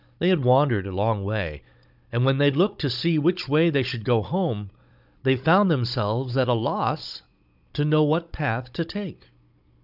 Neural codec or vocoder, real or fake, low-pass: codec, 16 kHz, 16 kbps, FunCodec, trained on Chinese and English, 50 frames a second; fake; 5.4 kHz